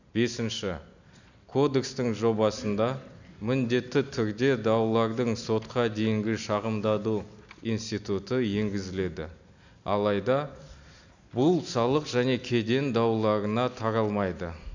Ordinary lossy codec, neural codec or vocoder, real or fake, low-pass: none; none; real; 7.2 kHz